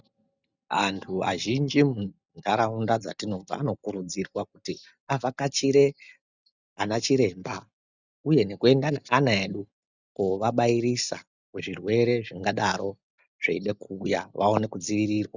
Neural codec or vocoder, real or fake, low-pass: none; real; 7.2 kHz